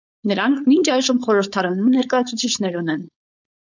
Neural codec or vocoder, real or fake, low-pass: codec, 16 kHz, 4.8 kbps, FACodec; fake; 7.2 kHz